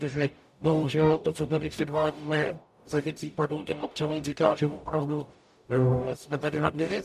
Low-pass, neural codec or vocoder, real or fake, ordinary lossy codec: 14.4 kHz; codec, 44.1 kHz, 0.9 kbps, DAC; fake; MP3, 96 kbps